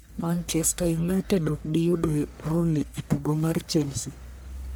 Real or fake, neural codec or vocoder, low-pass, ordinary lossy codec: fake; codec, 44.1 kHz, 1.7 kbps, Pupu-Codec; none; none